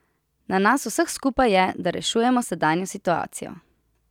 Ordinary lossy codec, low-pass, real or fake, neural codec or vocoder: none; 19.8 kHz; real; none